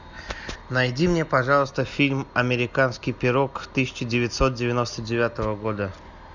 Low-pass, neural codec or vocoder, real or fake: 7.2 kHz; none; real